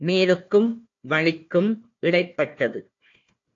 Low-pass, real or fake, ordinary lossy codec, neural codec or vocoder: 7.2 kHz; fake; AAC, 48 kbps; codec, 16 kHz, 1 kbps, FunCodec, trained on Chinese and English, 50 frames a second